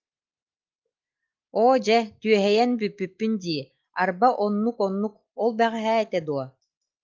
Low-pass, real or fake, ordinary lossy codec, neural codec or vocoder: 7.2 kHz; real; Opus, 32 kbps; none